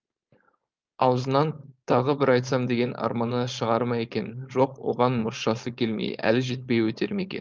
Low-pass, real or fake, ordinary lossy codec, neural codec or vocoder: 7.2 kHz; fake; Opus, 32 kbps; codec, 16 kHz, 4.8 kbps, FACodec